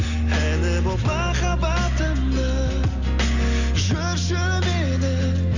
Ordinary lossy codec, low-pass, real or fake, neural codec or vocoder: Opus, 64 kbps; 7.2 kHz; real; none